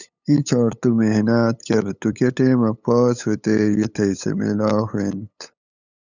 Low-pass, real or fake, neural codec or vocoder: 7.2 kHz; fake; codec, 16 kHz, 8 kbps, FunCodec, trained on LibriTTS, 25 frames a second